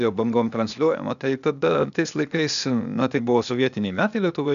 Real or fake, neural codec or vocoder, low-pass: fake; codec, 16 kHz, 0.8 kbps, ZipCodec; 7.2 kHz